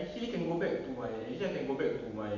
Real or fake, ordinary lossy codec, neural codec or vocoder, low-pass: real; none; none; 7.2 kHz